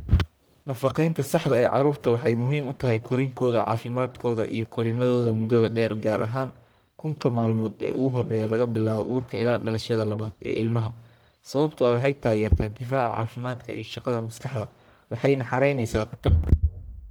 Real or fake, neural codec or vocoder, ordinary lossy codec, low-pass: fake; codec, 44.1 kHz, 1.7 kbps, Pupu-Codec; none; none